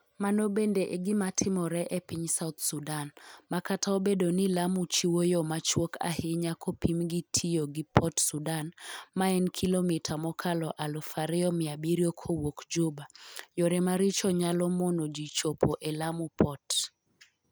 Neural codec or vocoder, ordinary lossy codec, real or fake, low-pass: none; none; real; none